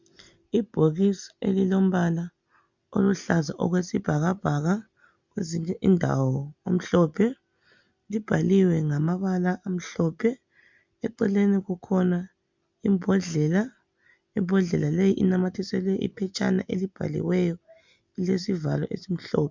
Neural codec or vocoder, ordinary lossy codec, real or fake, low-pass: none; MP3, 64 kbps; real; 7.2 kHz